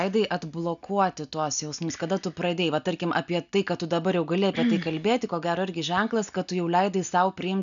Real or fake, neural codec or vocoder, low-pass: real; none; 7.2 kHz